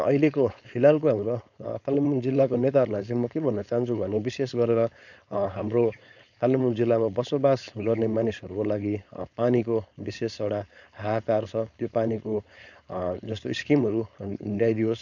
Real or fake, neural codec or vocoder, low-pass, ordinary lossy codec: fake; codec, 16 kHz, 4.8 kbps, FACodec; 7.2 kHz; none